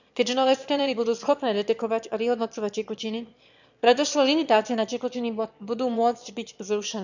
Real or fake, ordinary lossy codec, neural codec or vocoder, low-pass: fake; none; autoencoder, 22.05 kHz, a latent of 192 numbers a frame, VITS, trained on one speaker; 7.2 kHz